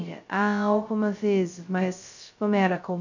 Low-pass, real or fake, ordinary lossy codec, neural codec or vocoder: 7.2 kHz; fake; none; codec, 16 kHz, 0.2 kbps, FocalCodec